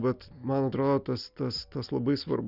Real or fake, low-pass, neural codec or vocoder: real; 5.4 kHz; none